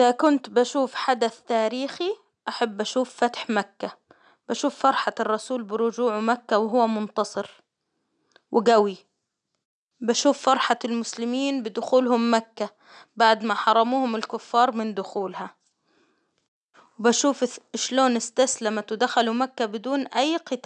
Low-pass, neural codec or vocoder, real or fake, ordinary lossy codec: 9.9 kHz; none; real; none